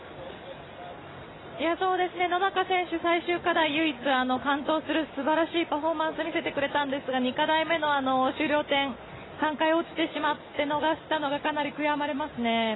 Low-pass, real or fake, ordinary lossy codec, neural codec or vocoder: 7.2 kHz; real; AAC, 16 kbps; none